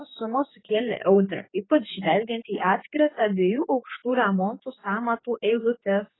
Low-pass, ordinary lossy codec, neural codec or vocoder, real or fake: 7.2 kHz; AAC, 16 kbps; codec, 16 kHz, 4 kbps, X-Codec, HuBERT features, trained on general audio; fake